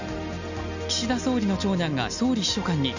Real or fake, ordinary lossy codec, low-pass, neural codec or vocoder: real; none; 7.2 kHz; none